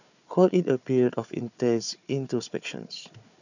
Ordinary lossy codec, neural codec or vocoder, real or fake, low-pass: none; codec, 16 kHz, 4 kbps, FunCodec, trained on Chinese and English, 50 frames a second; fake; 7.2 kHz